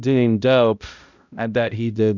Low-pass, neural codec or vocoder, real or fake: 7.2 kHz; codec, 16 kHz, 0.5 kbps, X-Codec, HuBERT features, trained on balanced general audio; fake